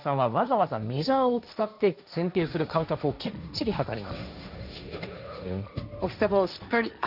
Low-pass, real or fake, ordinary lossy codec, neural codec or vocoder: 5.4 kHz; fake; none; codec, 16 kHz, 1.1 kbps, Voila-Tokenizer